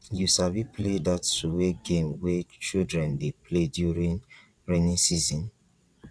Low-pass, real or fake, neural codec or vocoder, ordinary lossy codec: none; fake; vocoder, 22.05 kHz, 80 mel bands, WaveNeXt; none